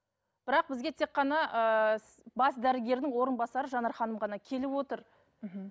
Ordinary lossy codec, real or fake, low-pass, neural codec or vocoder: none; real; none; none